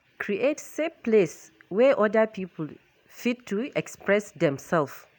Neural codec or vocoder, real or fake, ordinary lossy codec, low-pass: none; real; none; none